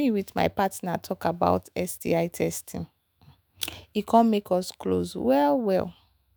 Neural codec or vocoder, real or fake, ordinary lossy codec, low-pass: autoencoder, 48 kHz, 128 numbers a frame, DAC-VAE, trained on Japanese speech; fake; none; none